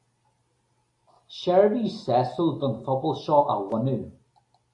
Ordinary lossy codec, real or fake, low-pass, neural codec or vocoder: AAC, 48 kbps; real; 10.8 kHz; none